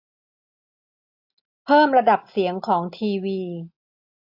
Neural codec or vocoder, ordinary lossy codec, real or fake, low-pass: none; AAC, 48 kbps; real; 5.4 kHz